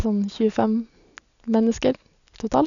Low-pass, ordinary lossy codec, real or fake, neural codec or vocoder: 7.2 kHz; none; real; none